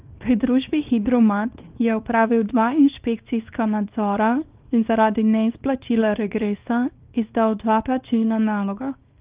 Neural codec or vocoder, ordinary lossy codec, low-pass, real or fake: codec, 24 kHz, 0.9 kbps, WavTokenizer, small release; Opus, 32 kbps; 3.6 kHz; fake